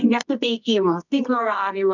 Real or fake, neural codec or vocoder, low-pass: fake; codec, 24 kHz, 0.9 kbps, WavTokenizer, medium music audio release; 7.2 kHz